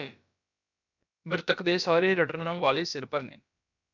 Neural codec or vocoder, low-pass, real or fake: codec, 16 kHz, about 1 kbps, DyCAST, with the encoder's durations; 7.2 kHz; fake